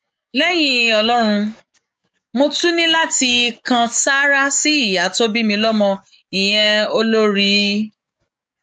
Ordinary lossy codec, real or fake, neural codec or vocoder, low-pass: none; fake; codec, 44.1 kHz, 7.8 kbps, DAC; 9.9 kHz